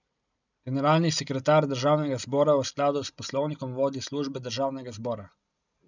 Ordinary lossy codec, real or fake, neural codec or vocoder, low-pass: none; real; none; 7.2 kHz